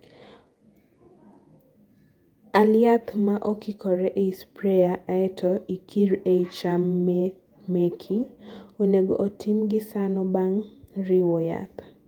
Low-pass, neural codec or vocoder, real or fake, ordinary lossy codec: 19.8 kHz; vocoder, 48 kHz, 128 mel bands, Vocos; fake; Opus, 32 kbps